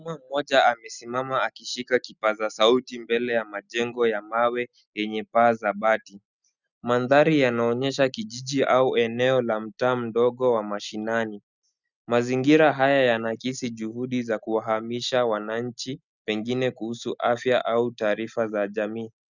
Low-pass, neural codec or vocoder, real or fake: 7.2 kHz; none; real